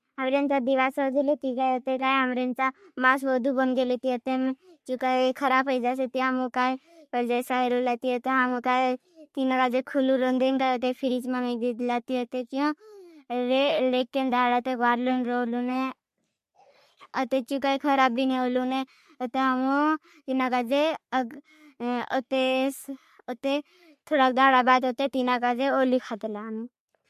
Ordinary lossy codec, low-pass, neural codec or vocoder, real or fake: MP3, 64 kbps; 14.4 kHz; codec, 44.1 kHz, 3.4 kbps, Pupu-Codec; fake